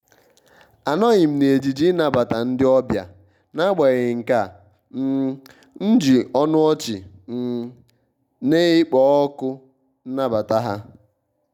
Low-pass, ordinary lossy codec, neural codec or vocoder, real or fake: 19.8 kHz; none; none; real